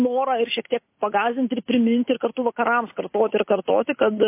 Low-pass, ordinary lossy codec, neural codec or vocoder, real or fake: 3.6 kHz; MP3, 24 kbps; none; real